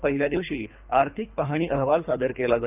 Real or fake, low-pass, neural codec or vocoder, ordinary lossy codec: fake; 3.6 kHz; codec, 24 kHz, 3 kbps, HILCodec; none